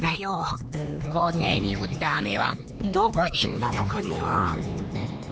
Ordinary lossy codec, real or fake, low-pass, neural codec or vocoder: none; fake; none; codec, 16 kHz, 2 kbps, X-Codec, HuBERT features, trained on LibriSpeech